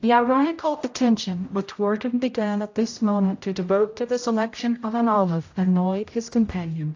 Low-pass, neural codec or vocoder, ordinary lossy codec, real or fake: 7.2 kHz; codec, 16 kHz, 0.5 kbps, X-Codec, HuBERT features, trained on general audio; AAC, 48 kbps; fake